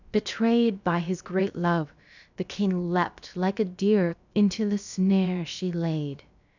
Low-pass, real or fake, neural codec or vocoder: 7.2 kHz; fake; codec, 16 kHz, about 1 kbps, DyCAST, with the encoder's durations